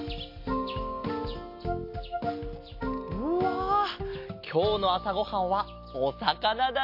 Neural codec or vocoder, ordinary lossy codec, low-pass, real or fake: none; MP3, 32 kbps; 5.4 kHz; real